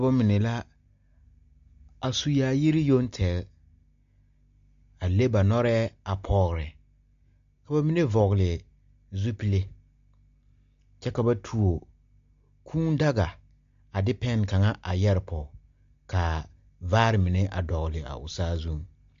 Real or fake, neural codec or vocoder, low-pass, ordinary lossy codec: real; none; 7.2 kHz; MP3, 48 kbps